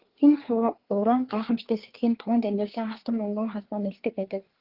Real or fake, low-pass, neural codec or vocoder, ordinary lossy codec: fake; 5.4 kHz; codec, 24 kHz, 1 kbps, SNAC; Opus, 16 kbps